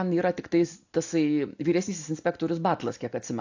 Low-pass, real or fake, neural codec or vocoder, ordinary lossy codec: 7.2 kHz; real; none; AAC, 48 kbps